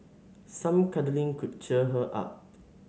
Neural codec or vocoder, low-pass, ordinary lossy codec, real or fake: none; none; none; real